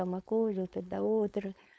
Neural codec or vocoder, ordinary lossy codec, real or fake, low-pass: codec, 16 kHz, 4.8 kbps, FACodec; none; fake; none